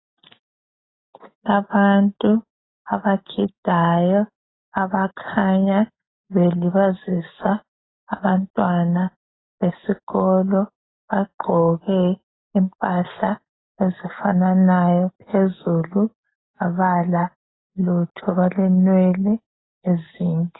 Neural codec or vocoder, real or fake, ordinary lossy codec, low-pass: none; real; AAC, 16 kbps; 7.2 kHz